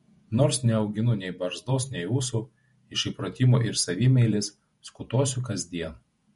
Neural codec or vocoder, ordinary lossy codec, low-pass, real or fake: vocoder, 48 kHz, 128 mel bands, Vocos; MP3, 48 kbps; 19.8 kHz; fake